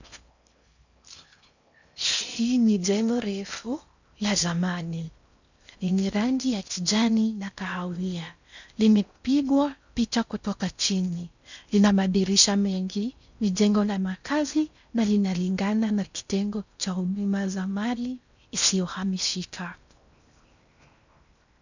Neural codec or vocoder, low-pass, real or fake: codec, 16 kHz in and 24 kHz out, 0.6 kbps, FocalCodec, streaming, 4096 codes; 7.2 kHz; fake